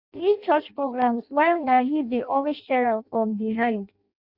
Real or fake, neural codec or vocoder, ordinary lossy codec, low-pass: fake; codec, 16 kHz in and 24 kHz out, 0.6 kbps, FireRedTTS-2 codec; Opus, 64 kbps; 5.4 kHz